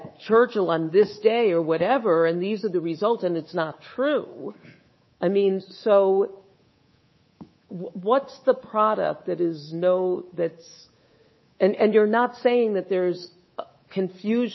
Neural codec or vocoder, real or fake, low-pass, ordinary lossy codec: codec, 24 kHz, 3.1 kbps, DualCodec; fake; 7.2 kHz; MP3, 24 kbps